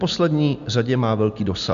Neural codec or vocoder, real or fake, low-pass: none; real; 7.2 kHz